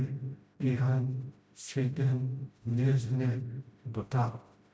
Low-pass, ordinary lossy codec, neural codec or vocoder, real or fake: none; none; codec, 16 kHz, 0.5 kbps, FreqCodec, smaller model; fake